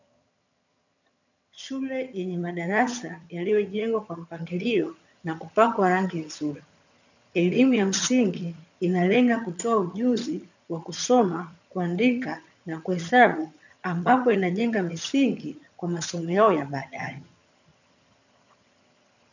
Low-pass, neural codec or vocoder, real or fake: 7.2 kHz; vocoder, 22.05 kHz, 80 mel bands, HiFi-GAN; fake